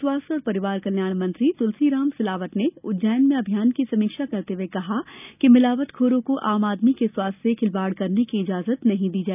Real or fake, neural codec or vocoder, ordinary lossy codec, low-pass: real; none; none; 3.6 kHz